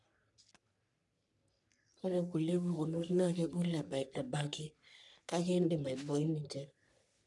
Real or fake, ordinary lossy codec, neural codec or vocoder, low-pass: fake; none; codec, 44.1 kHz, 3.4 kbps, Pupu-Codec; 10.8 kHz